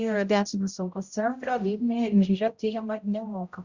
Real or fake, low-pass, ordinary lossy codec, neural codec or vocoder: fake; 7.2 kHz; Opus, 64 kbps; codec, 16 kHz, 0.5 kbps, X-Codec, HuBERT features, trained on general audio